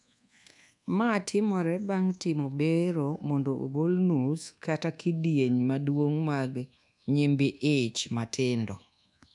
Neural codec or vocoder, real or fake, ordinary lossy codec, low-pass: codec, 24 kHz, 1.2 kbps, DualCodec; fake; none; 10.8 kHz